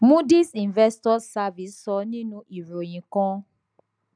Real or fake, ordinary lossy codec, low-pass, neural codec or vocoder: real; none; 9.9 kHz; none